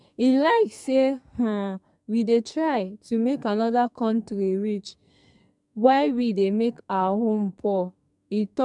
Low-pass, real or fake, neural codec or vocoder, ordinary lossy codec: 10.8 kHz; fake; codec, 44.1 kHz, 2.6 kbps, SNAC; MP3, 96 kbps